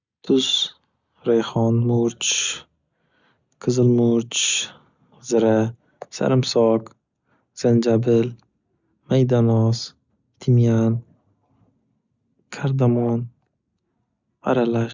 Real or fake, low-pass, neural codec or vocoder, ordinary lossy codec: real; 7.2 kHz; none; Opus, 64 kbps